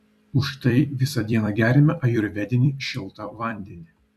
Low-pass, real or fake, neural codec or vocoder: 14.4 kHz; fake; vocoder, 44.1 kHz, 128 mel bands every 256 samples, BigVGAN v2